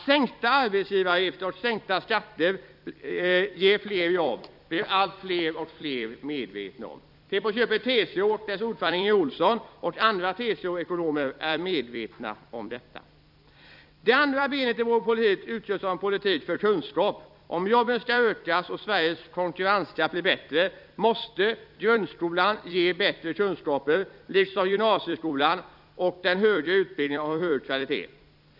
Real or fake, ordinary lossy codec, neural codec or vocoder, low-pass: fake; none; vocoder, 44.1 kHz, 128 mel bands every 256 samples, BigVGAN v2; 5.4 kHz